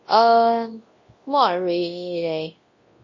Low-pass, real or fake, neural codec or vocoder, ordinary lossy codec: 7.2 kHz; fake; codec, 24 kHz, 0.9 kbps, WavTokenizer, large speech release; MP3, 32 kbps